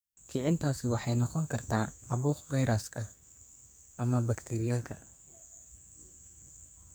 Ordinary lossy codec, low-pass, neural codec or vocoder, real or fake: none; none; codec, 44.1 kHz, 2.6 kbps, SNAC; fake